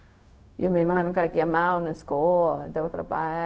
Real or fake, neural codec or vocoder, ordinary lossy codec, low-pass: fake; codec, 16 kHz, 0.4 kbps, LongCat-Audio-Codec; none; none